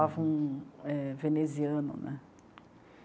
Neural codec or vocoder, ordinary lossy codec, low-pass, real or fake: none; none; none; real